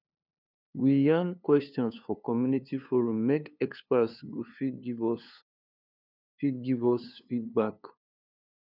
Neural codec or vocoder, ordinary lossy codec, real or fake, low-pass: codec, 16 kHz, 2 kbps, FunCodec, trained on LibriTTS, 25 frames a second; none; fake; 5.4 kHz